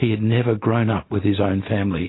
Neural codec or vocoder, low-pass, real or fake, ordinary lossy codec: none; 7.2 kHz; real; AAC, 16 kbps